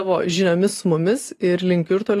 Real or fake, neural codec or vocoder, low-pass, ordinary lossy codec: real; none; 14.4 kHz; AAC, 48 kbps